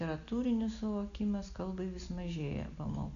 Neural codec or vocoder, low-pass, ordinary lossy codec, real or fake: none; 7.2 kHz; AAC, 96 kbps; real